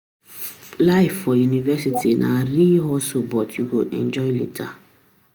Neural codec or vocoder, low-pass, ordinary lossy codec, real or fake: none; none; none; real